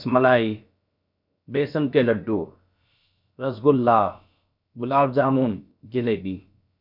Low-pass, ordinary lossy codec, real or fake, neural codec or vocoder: 5.4 kHz; none; fake; codec, 16 kHz, about 1 kbps, DyCAST, with the encoder's durations